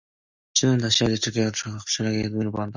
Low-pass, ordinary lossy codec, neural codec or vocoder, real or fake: 7.2 kHz; Opus, 64 kbps; none; real